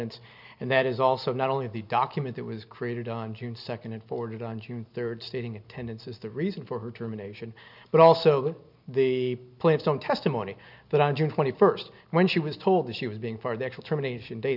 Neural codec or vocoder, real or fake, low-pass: none; real; 5.4 kHz